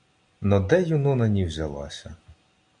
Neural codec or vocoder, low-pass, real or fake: none; 9.9 kHz; real